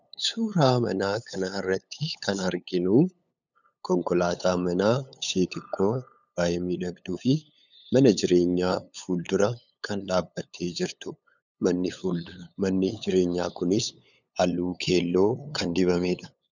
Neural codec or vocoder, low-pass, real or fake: codec, 16 kHz, 8 kbps, FunCodec, trained on LibriTTS, 25 frames a second; 7.2 kHz; fake